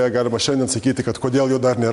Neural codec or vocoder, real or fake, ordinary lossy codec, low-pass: none; real; MP3, 64 kbps; 10.8 kHz